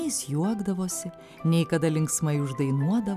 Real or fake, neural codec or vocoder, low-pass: real; none; 14.4 kHz